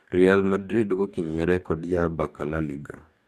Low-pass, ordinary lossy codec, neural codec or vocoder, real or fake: 14.4 kHz; none; codec, 44.1 kHz, 2.6 kbps, DAC; fake